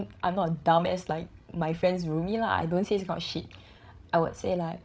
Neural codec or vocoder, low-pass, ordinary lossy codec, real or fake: codec, 16 kHz, 16 kbps, FreqCodec, larger model; none; none; fake